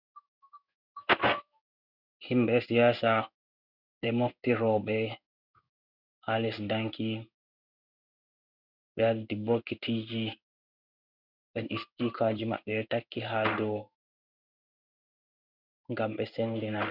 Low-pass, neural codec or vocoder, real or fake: 5.4 kHz; codec, 16 kHz in and 24 kHz out, 1 kbps, XY-Tokenizer; fake